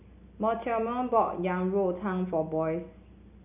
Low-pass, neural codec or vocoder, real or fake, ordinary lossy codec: 3.6 kHz; none; real; none